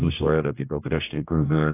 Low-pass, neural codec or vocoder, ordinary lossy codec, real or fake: 3.6 kHz; codec, 16 kHz, 1 kbps, X-Codec, HuBERT features, trained on general audio; AAC, 24 kbps; fake